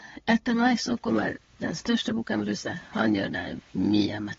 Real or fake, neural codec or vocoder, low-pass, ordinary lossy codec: fake; codec, 16 kHz, 8 kbps, FunCodec, trained on LibriTTS, 25 frames a second; 7.2 kHz; AAC, 24 kbps